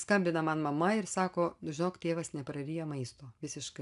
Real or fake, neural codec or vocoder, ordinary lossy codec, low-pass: real; none; AAC, 96 kbps; 10.8 kHz